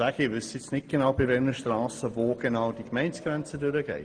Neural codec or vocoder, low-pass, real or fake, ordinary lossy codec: none; 9.9 kHz; real; Opus, 24 kbps